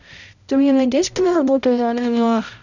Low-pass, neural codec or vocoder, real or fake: 7.2 kHz; codec, 16 kHz, 0.5 kbps, X-Codec, HuBERT features, trained on balanced general audio; fake